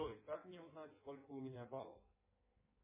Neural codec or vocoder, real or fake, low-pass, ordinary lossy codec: codec, 16 kHz in and 24 kHz out, 1.1 kbps, FireRedTTS-2 codec; fake; 3.6 kHz; MP3, 16 kbps